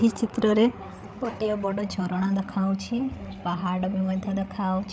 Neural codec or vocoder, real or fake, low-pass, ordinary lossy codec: codec, 16 kHz, 8 kbps, FreqCodec, larger model; fake; none; none